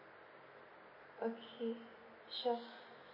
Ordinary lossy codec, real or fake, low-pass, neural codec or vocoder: MP3, 24 kbps; real; 5.4 kHz; none